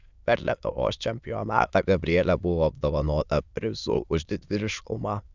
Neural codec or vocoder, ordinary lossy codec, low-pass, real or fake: autoencoder, 22.05 kHz, a latent of 192 numbers a frame, VITS, trained on many speakers; Opus, 64 kbps; 7.2 kHz; fake